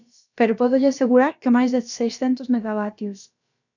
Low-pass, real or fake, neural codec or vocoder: 7.2 kHz; fake; codec, 16 kHz, about 1 kbps, DyCAST, with the encoder's durations